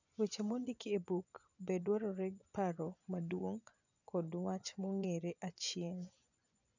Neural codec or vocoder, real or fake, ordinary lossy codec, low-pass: vocoder, 24 kHz, 100 mel bands, Vocos; fake; none; 7.2 kHz